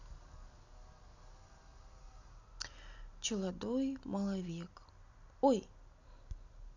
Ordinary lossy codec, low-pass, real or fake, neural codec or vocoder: none; 7.2 kHz; real; none